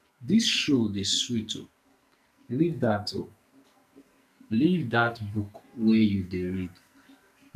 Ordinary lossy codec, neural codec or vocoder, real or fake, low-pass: none; codec, 44.1 kHz, 2.6 kbps, SNAC; fake; 14.4 kHz